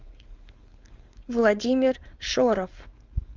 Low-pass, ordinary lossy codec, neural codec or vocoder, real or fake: 7.2 kHz; Opus, 32 kbps; codec, 16 kHz, 4.8 kbps, FACodec; fake